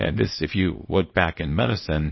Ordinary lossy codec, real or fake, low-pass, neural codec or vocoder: MP3, 24 kbps; fake; 7.2 kHz; codec, 24 kHz, 0.9 kbps, WavTokenizer, small release